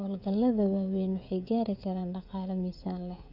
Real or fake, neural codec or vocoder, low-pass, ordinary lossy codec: fake; codec, 16 kHz, 16 kbps, FreqCodec, smaller model; 5.4 kHz; none